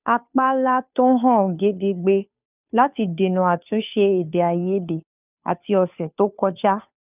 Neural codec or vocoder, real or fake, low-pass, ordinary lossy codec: codec, 16 kHz, 2 kbps, FunCodec, trained on Chinese and English, 25 frames a second; fake; 3.6 kHz; none